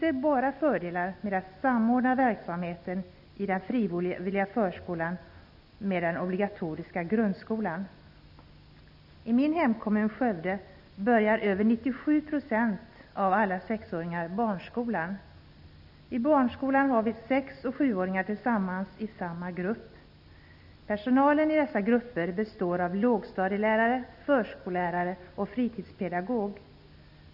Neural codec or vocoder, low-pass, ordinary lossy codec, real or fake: none; 5.4 kHz; none; real